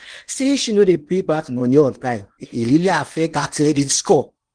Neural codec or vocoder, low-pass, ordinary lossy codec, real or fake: codec, 16 kHz in and 24 kHz out, 0.8 kbps, FocalCodec, streaming, 65536 codes; 9.9 kHz; Opus, 24 kbps; fake